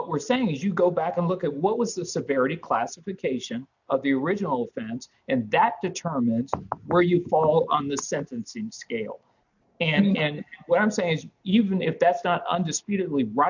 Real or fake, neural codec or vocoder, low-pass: real; none; 7.2 kHz